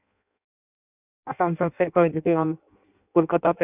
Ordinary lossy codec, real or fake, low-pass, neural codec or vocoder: none; fake; 3.6 kHz; codec, 16 kHz in and 24 kHz out, 0.6 kbps, FireRedTTS-2 codec